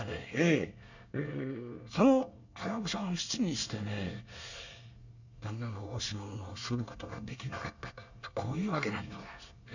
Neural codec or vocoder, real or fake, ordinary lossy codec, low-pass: codec, 24 kHz, 1 kbps, SNAC; fake; none; 7.2 kHz